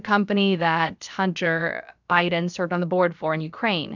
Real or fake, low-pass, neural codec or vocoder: fake; 7.2 kHz; codec, 16 kHz, about 1 kbps, DyCAST, with the encoder's durations